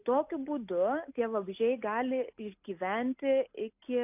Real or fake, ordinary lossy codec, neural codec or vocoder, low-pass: real; AAC, 32 kbps; none; 3.6 kHz